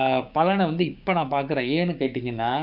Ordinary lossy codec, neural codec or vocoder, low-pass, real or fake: none; codec, 16 kHz, 6 kbps, DAC; 5.4 kHz; fake